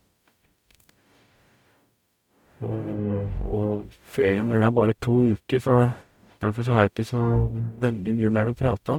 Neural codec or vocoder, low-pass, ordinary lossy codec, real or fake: codec, 44.1 kHz, 0.9 kbps, DAC; 19.8 kHz; MP3, 96 kbps; fake